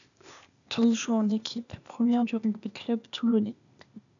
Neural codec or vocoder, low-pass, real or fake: codec, 16 kHz, 0.8 kbps, ZipCodec; 7.2 kHz; fake